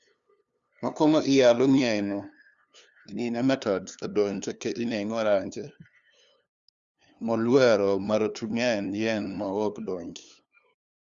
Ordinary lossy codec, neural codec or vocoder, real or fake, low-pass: Opus, 64 kbps; codec, 16 kHz, 2 kbps, FunCodec, trained on LibriTTS, 25 frames a second; fake; 7.2 kHz